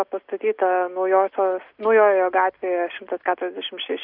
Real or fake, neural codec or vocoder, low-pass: real; none; 5.4 kHz